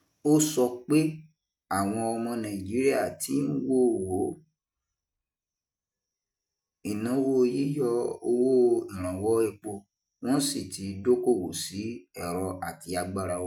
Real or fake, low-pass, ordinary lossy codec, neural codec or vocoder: real; 19.8 kHz; none; none